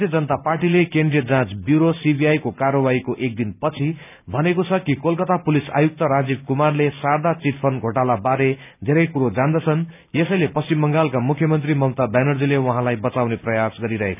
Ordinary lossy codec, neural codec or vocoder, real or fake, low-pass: none; none; real; 3.6 kHz